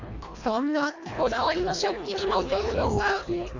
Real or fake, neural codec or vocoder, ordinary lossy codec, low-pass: fake; codec, 24 kHz, 1.5 kbps, HILCodec; AAC, 48 kbps; 7.2 kHz